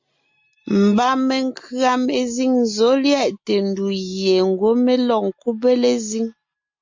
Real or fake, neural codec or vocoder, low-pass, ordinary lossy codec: real; none; 7.2 kHz; MP3, 48 kbps